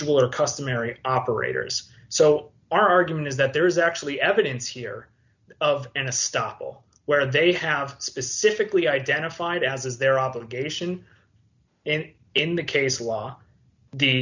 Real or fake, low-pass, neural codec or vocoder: real; 7.2 kHz; none